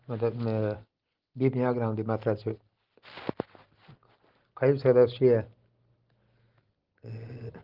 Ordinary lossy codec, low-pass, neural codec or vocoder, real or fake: Opus, 16 kbps; 5.4 kHz; none; real